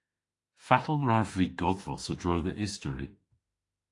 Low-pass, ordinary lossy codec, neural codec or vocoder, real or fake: 10.8 kHz; AAC, 48 kbps; autoencoder, 48 kHz, 32 numbers a frame, DAC-VAE, trained on Japanese speech; fake